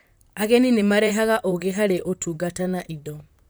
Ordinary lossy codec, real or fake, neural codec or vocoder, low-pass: none; fake; vocoder, 44.1 kHz, 128 mel bands, Pupu-Vocoder; none